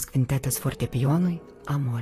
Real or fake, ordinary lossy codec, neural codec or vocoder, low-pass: fake; AAC, 48 kbps; vocoder, 44.1 kHz, 128 mel bands, Pupu-Vocoder; 14.4 kHz